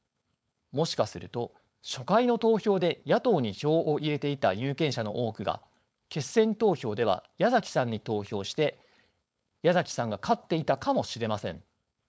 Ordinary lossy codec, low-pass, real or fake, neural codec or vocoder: none; none; fake; codec, 16 kHz, 4.8 kbps, FACodec